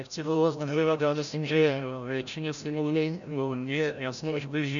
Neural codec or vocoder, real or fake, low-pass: codec, 16 kHz, 0.5 kbps, FreqCodec, larger model; fake; 7.2 kHz